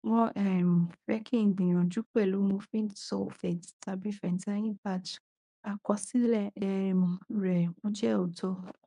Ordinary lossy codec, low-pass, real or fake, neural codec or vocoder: MP3, 64 kbps; 10.8 kHz; fake; codec, 24 kHz, 0.9 kbps, WavTokenizer, medium speech release version 1